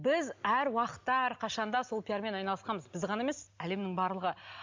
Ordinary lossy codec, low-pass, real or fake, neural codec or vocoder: MP3, 64 kbps; 7.2 kHz; real; none